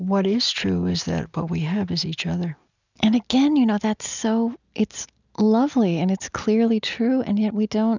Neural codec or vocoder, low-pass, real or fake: none; 7.2 kHz; real